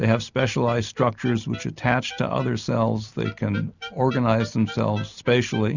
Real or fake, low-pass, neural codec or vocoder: real; 7.2 kHz; none